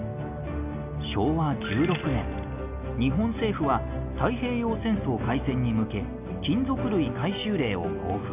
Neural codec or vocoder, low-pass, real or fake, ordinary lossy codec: none; 3.6 kHz; real; none